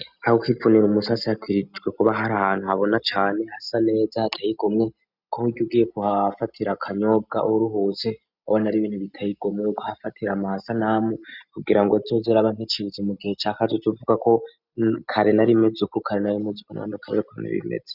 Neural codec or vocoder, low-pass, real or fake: none; 5.4 kHz; real